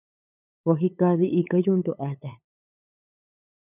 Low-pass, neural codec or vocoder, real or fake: 3.6 kHz; codec, 16 kHz, 8 kbps, FunCodec, trained on LibriTTS, 25 frames a second; fake